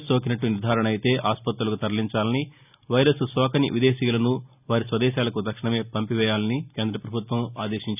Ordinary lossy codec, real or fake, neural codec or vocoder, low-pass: none; real; none; 3.6 kHz